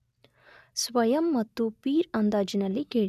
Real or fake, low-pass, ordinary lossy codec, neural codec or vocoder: real; 14.4 kHz; none; none